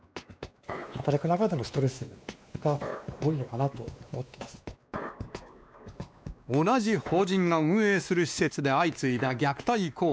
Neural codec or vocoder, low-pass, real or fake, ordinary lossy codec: codec, 16 kHz, 2 kbps, X-Codec, WavLM features, trained on Multilingual LibriSpeech; none; fake; none